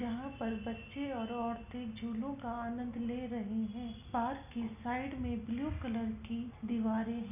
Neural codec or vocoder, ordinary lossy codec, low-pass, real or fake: none; none; 3.6 kHz; real